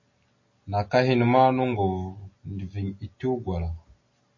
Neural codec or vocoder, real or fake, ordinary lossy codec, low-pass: none; real; MP3, 32 kbps; 7.2 kHz